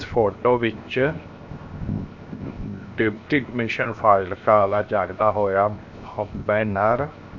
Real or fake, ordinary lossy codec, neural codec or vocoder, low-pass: fake; MP3, 64 kbps; codec, 16 kHz, 0.7 kbps, FocalCodec; 7.2 kHz